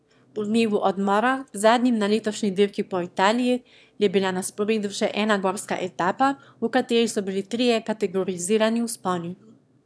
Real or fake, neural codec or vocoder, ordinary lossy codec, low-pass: fake; autoencoder, 22.05 kHz, a latent of 192 numbers a frame, VITS, trained on one speaker; none; none